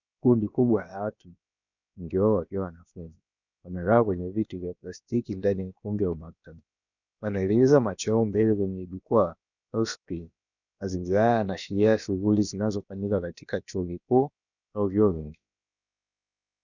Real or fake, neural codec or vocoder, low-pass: fake; codec, 16 kHz, about 1 kbps, DyCAST, with the encoder's durations; 7.2 kHz